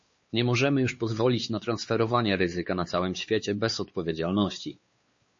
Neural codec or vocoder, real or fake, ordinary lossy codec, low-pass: codec, 16 kHz, 4 kbps, X-Codec, WavLM features, trained on Multilingual LibriSpeech; fake; MP3, 32 kbps; 7.2 kHz